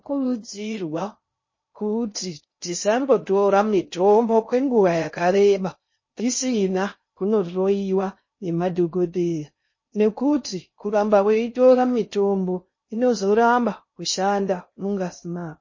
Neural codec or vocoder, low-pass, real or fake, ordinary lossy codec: codec, 16 kHz in and 24 kHz out, 0.6 kbps, FocalCodec, streaming, 2048 codes; 7.2 kHz; fake; MP3, 32 kbps